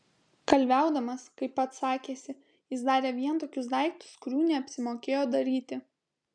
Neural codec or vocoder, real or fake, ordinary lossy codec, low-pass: none; real; MP3, 96 kbps; 9.9 kHz